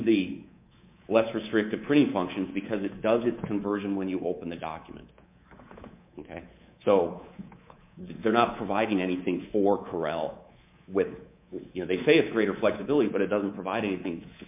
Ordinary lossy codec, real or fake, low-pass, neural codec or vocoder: MP3, 32 kbps; fake; 3.6 kHz; codec, 24 kHz, 3.1 kbps, DualCodec